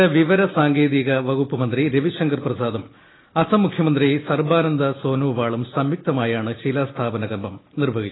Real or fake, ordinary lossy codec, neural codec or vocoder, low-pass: real; AAC, 16 kbps; none; 7.2 kHz